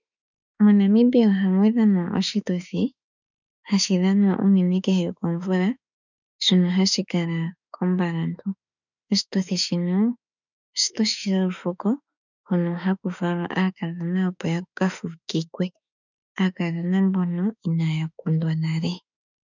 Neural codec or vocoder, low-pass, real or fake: autoencoder, 48 kHz, 32 numbers a frame, DAC-VAE, trained on Japanese speech; 7.2 kHz; fake